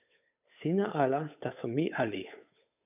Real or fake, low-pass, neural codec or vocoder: fake; 3.6 kHz; codec, 16 kHz, 8 kbps, FunCodec, trained on Chinese and English, 25 frames a second